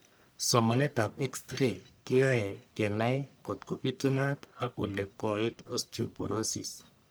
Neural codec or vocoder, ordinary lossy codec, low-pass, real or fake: codec, 44.1 kHz, 1.7 kbps, Pupu-Codec; none; none; fake